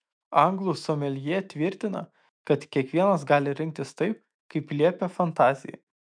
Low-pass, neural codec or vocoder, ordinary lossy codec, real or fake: 9.9 kHz; autoencoder, 48 kHz, 128 numbers a frame, DAC-VAE, trained on Japanese speech; MP3, 96 kbps; fake